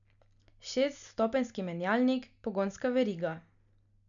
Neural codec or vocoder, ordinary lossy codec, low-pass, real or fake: none; none; 7.2 kHz; real